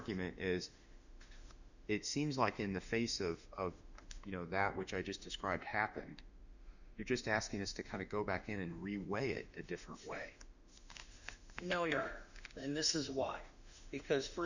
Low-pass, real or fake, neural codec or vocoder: 7.2 kHz; fake; autoencoder, 48 kHz, 32 numbers a frame, DAC-VAE, trained on Japanese speech